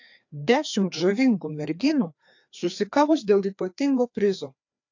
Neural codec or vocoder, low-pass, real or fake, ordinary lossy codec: codec, 16 kHz, 2 kbps, FreqCodec, larger model; 7.2 kHz; fake; AAC, 48 kbps